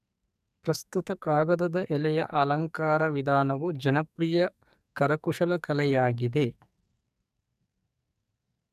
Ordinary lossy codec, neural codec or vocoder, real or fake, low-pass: none; codec, 44.1 kHz, 2.6 kbps, SNAC; fake; 14.4 kHz